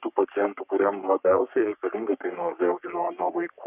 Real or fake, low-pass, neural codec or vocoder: fake; 3.6 kHz; codec, 44.1 kHz, 3.4 kbps, Pupu-Codec